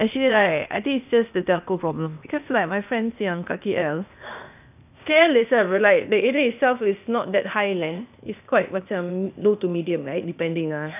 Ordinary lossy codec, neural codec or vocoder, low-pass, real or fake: none; codec, 16 kHz, 0.8 kbps, ZipCodec; 3.6 kHz; fake